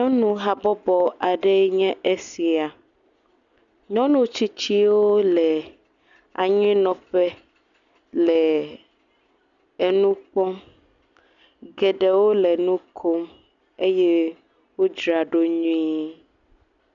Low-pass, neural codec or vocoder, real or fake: 7.2 kHz; none; real